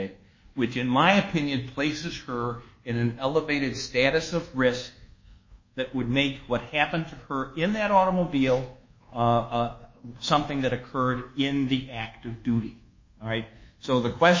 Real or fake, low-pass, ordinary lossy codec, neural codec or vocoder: fake; 7.2 kHz; MP3, 32 kbps; codec, 24 kHz, 1.2 kbps, DualCodec